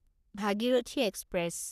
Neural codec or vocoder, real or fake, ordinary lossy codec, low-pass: codec, 44.1 kHz, 3.4 kbps, Pupu-Codec; fake; none; 14.4 kHz